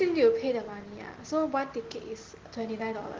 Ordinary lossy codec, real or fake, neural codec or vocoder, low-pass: Opus, 16 kbps; real; none; 7.2 kHz